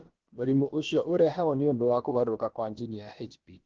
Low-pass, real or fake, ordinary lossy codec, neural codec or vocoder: 7.2 kHz; fake; Opus, 16 kbps; codec, 16 kHz, about 1 kbps, DyCAST, with the encoder's durations